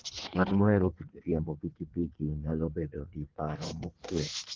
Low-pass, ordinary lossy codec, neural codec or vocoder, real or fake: 7.2 kHz; Opus, 16 kbps; codec, 16 kHz in and 24 kHz out, 1.1 kbps, FireRedTTS-2 codec; fake